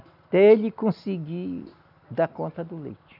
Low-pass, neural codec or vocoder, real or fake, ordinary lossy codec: 5.4 kHz; none; real; none